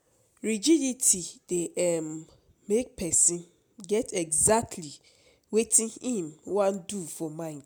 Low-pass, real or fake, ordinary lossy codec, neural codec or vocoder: none; real; none; none